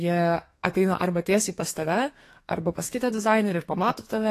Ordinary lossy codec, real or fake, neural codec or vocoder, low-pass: AAC, 48 kbps; fake; codec, 32 kHz, 1.9 kbps, SNAC; 14.4 kHz